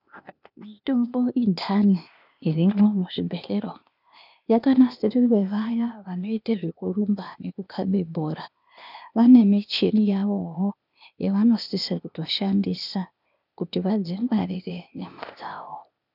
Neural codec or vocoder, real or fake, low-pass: codec, 16 kHz, 0.8 kbps, ZipCodec; fake; 5.4 kHz